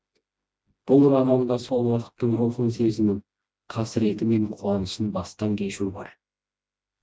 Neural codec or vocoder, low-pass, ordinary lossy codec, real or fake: codec, 16 kHz, 1 kbps, FreqCodec, smaller model; none; none; fake